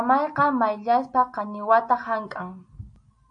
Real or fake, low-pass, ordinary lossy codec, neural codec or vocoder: real; 9.9 kHz; AAC, 64 kbps; none